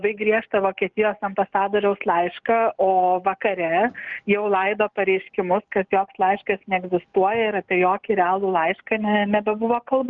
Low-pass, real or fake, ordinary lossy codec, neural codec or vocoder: 7.2 kHz; real; Opus, 32 kbps; none